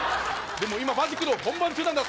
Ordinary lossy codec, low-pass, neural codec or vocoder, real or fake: none; none; none; real